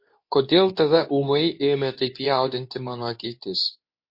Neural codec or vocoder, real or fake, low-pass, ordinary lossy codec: codec, 24 kHz, 0.9 kbps, WavTokenizer, medium speech release version 2; fake; 5.4 kHz; MP3, 32 kbps